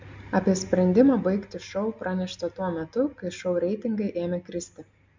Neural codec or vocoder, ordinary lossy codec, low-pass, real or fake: none; MP3, 64 kbps; 7.2 kHz; real